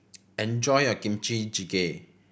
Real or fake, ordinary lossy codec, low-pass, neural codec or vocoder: real; none; none; none